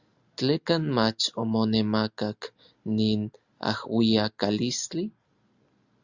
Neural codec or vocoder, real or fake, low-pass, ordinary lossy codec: none; real; 7.2 kHz; Opus, 64 kbps